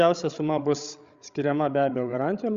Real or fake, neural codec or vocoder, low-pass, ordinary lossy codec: fake; codec, 16 kHz, 16 kbps, FreqCodec, larger model; 7.2 kHz; Opus, 64 kbps